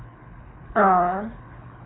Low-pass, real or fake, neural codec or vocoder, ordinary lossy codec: 7.2 kHz; fake; codec, 24 kHz, 6 kbps, HILCodec; AAC, 16 kbps